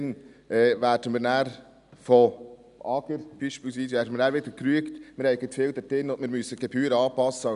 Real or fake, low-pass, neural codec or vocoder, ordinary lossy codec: real; 10.8 kHz; none; none